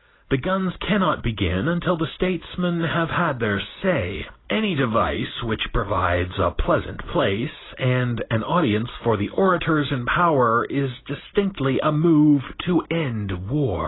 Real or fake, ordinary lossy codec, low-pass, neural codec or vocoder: real; AAC, 16 kbps; 7.2 kHz; none